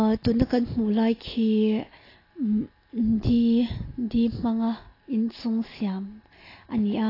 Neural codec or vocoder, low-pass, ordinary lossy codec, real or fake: none; 5.4 kHz; AAC, 24 kbps; real